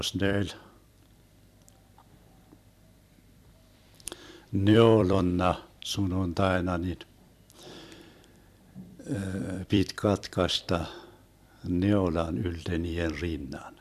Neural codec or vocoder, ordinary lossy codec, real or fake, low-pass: vocoder, 44.1 kHz, 128 mel bands every 256 samples, BigVGAN v2; MP3, 96 kbps; fake; 14.4 kHz